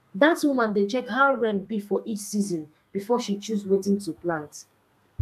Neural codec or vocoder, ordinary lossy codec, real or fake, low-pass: codec, 32 kHz, 1.9 kbps, SNAC; none; fake; 14.4 kHz